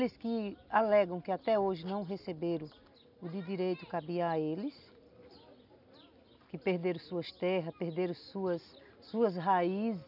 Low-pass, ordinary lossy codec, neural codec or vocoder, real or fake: 5.4 kHz; none; none; real